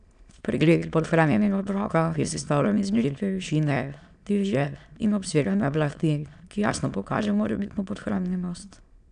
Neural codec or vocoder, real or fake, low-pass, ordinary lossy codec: autoencoder, 22.05 kHz, a latent of 192 numbers a frame, VITS, trained on many speakers; fake; 9.9 kHz; none